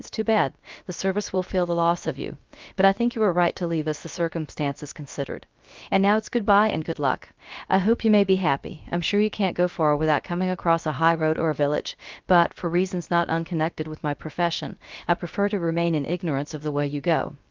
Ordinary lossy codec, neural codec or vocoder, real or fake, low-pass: Opus, 24 kbps; codec, 16 kHz, about 1 kbps, DyCAST, with the encoder's durations; fake; 7.2 kHz